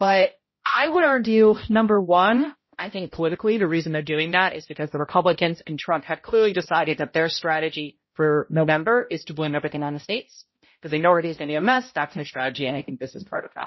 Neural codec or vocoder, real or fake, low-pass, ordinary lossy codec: codec, 16 kHz, 0.5 kbps, X-Codec, HuBERT features, trained on balanced general audio; fake; 7.2 kHz; MP3, 24 kbps